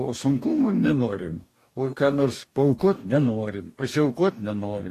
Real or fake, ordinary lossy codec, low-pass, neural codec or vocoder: fake; AAC, 48 kbps; 14.4 kHz; codec, 44.1 kHz, 2.6 kbps, DAC